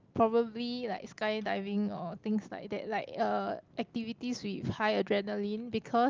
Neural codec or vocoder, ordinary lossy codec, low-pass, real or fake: none; Opus, 32 kbps; 7.2 kHz; real